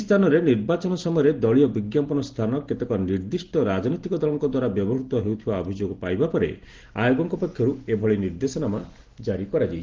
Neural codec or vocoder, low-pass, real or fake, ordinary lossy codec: none; 7.2 kHz; real; Opus, 16 kbps